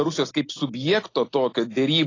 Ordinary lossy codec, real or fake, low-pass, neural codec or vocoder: AAC, 32 kbps; real; 7.2 kHz; none